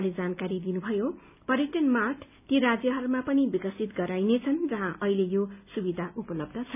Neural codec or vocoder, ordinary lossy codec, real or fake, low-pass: none; none; real; 3.6 kHz